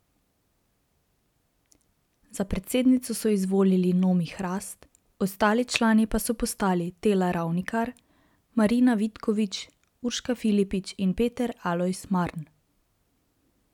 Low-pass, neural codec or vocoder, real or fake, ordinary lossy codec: 19.8 kHz; none; real; none